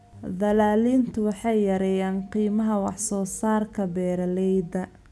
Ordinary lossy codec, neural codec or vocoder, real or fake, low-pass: none; none; real; none